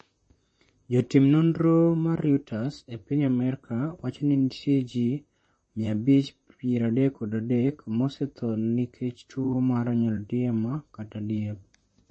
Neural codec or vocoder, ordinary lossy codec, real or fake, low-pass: vocoder, 44.1 kHz, 128 mel bands, Pupu-Vocoder; MP3, 32 kbps; fake; 9.9 kHz